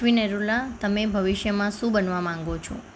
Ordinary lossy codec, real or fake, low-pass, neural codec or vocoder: none; real; none; none